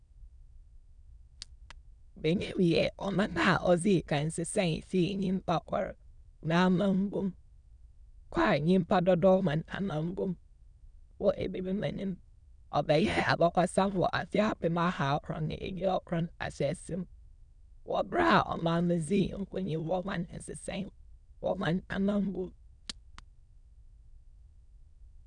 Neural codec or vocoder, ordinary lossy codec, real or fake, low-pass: autoencoder, 22.05 kHz, a latent of 192 numbers a frame, VITS, trained on many speakers; none; fake; 9.9 kHz